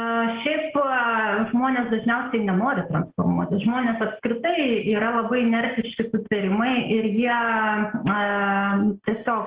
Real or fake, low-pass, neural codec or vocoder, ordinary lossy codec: real; 3.6 kHz; none; Opus, 16 kbps